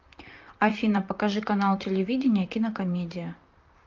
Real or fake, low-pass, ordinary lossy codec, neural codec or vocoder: fake; 7.2 kHz; Opus, 24 kbps; vocoder, 44.1 kHz, 128 mel bands, Pupu-Vocoder